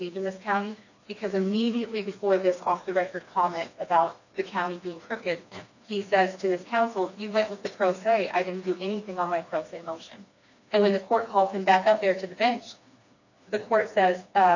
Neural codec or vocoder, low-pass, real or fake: codec, 16 kHz, 2 kbps, FreqCodec, smaller model; 7.2 kHz; fake